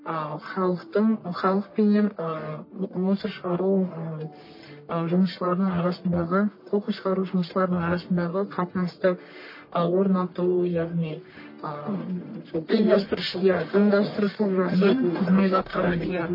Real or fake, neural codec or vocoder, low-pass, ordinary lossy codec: fake; codec, 44.1 kHz, 1.7 kbps, Pupu-Codec; 5.4 kHz; MP3, 24 kbps